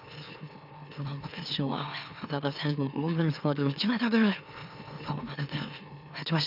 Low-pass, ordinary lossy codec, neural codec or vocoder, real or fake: 5.4 kHz; none; autoencoder, 44.1 kHz, a latent of 192 numbers a frame, MeloTTS; fake